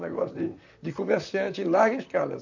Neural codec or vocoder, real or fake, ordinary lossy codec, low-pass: vocoder, 44.1 kHz, 128 mel bands, Pupu-Vocoder; fake; none; 7.2 kHz